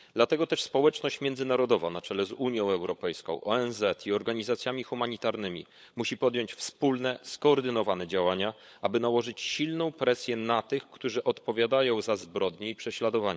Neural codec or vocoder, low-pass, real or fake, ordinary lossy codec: codec, 16 kHz, 16 kbps, FunCodec, trained on LibriTTS, 50 frames a second; none; fake; none